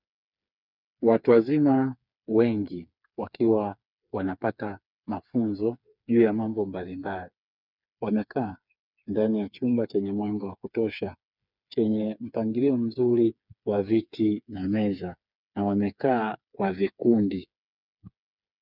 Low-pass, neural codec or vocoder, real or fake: 5.4 kHz; codec, 16 kHz, 4 kbps, FreqCodec, smaller model; fake